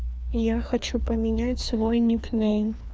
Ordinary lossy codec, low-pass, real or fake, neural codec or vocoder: none; none; fake; codec, 16 kHz, 2 kbps, FreqCodec, larger model